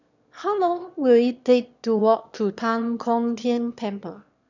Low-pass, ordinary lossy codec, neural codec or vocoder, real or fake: 7.2 kHz; none; autoencoder, 22.05 kHz, a latent of 192 numbers a frame, VITS, trained on one speaker; fake